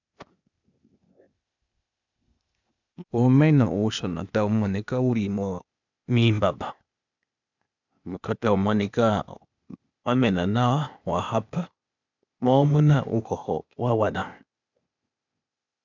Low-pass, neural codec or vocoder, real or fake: 7.2 kHz; codec, 16 kHz, 0.8 kbps, ZipCodec; fake